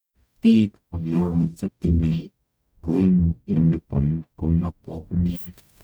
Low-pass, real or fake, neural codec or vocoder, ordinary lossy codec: none; fake; codec, 44.1 kHz, 0.9 kbps, DAC; none